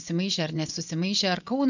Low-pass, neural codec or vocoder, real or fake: 7.2 kHz; vocoder, 24 kHz, 100 mel bands, Vocos; fake